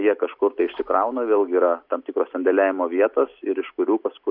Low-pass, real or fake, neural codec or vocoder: 5.4 kHz; real; none